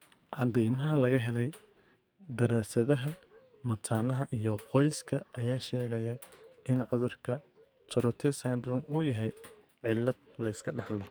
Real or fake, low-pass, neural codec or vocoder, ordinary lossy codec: fake; none; codec, 44.1 kHz, 2.6 kbps, SNAC; none